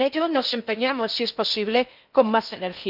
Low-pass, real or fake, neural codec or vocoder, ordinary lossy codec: 5.4 kHz; fake; codec, 16 kHz in and 24 kHz out, 0.6 kbps, FocalCodec, streaming, 4096 codes; none